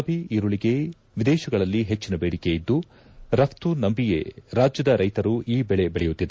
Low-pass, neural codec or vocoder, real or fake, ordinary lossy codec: none; none; real; none